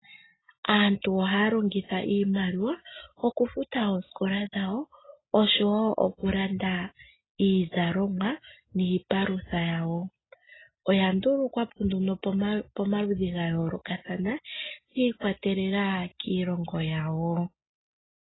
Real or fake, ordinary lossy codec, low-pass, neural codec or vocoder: real; AAC, 16 kbps; 7.2 kHz; none